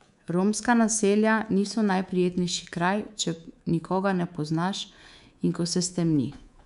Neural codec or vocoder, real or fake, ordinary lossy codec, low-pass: codec, 24 kHz, 3.1 kbps, DualCodec; fake; none; 10.8 kHz